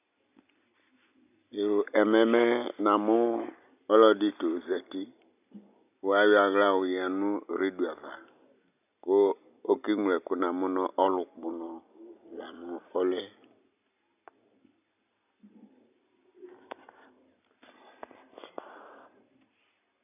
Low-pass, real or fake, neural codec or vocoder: 3.6 kHz; real; none